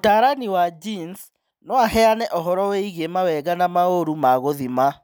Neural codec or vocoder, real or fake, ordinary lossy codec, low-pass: none; real; none; none